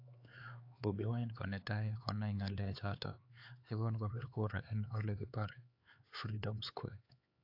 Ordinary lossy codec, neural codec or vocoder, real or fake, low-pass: AAC, 32 kbps; codec, 16 kHz, 4 kbps, X-Codec, HuBERT features, trained on LibriSpeech; fake; 5.4 kHz